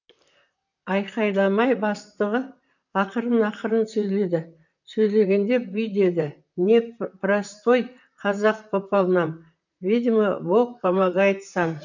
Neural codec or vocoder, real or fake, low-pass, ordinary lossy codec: vocoder, 44.1 kHz, 128 mel bands, Pupu-Vocoder; fake; 7.2 kHz; none